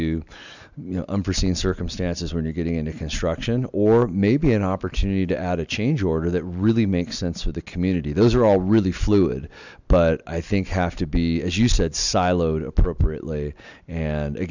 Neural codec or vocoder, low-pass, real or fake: none; 7.2 kHz; real